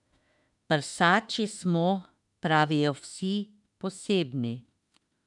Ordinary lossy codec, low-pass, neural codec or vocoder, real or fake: none; 10.8 kHz; autoencoder, 48 kHz, 32 numbers a frame, DAC-VAE, trained on Japanese speech; fake